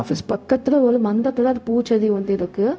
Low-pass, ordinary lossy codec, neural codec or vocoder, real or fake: none; none; codec, 16 kHz, 0.4 kbps, LongCat-Audio-Codec; fake